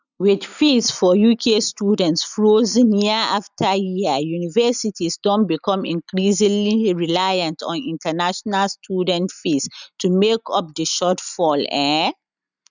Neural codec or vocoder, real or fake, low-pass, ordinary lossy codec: none; real; 7.2 kHz; none